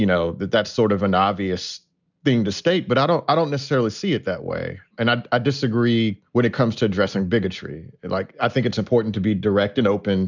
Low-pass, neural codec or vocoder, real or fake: 7.2 kHz; none; real